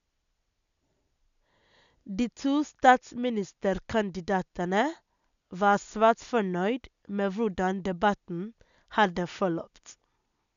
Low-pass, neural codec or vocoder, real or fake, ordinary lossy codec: 7.2 kHz; none; real; none